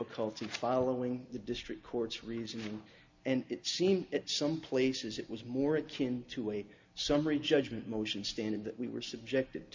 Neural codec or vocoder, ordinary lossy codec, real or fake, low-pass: none; MP3, 64 kbps; real; 7.2 kHz